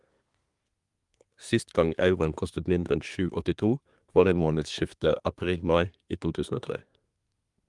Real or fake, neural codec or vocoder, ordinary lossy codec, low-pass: fake; codec, 24 kHz, 1 kbps, SNAC; Opus, 32 kbps; 10.8 kHz